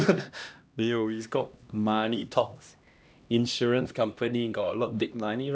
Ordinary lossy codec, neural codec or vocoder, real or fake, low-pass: none; codec, 16 kHz, 1 kbps, X-Codec, HuBERT features, trained on LibriSpeech; fake; none